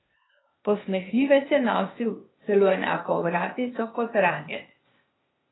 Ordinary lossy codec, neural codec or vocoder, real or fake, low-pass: AAC, 16 kbps; codec, 16 kHz, 0.8 kbps, ZipCodec; fake; 7.2 kHz